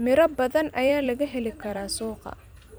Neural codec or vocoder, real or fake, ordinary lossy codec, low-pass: vocoder, 44.1 kHz, 128 mel bands every 256 samples, BigVGAN v2; fake; none; none